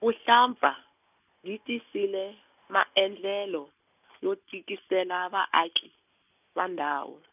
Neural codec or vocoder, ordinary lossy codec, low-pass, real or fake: none; none; 3.6 kHz; real